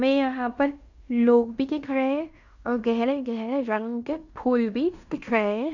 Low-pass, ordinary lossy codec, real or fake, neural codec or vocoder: 7.2 kHz; none; fake; codec, 16 kHz in and 24 kHz out, 0.9 kbps, LongCat-Audio-Codec, fine tuned four codebook decoder